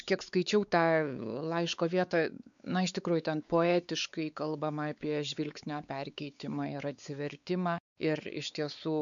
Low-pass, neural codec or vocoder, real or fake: 7.2 kHz; codec, 16 kHz, 4 kbps, X-Codec, WavLM features, trained on Multilingual LibriSpeech; fake